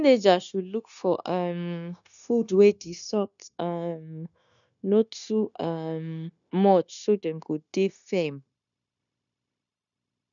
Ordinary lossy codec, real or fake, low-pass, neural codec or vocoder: none; fake; 7.2 kHz; codec, 16 kHz, 0.9 kbps, LongCat-Audio-Codec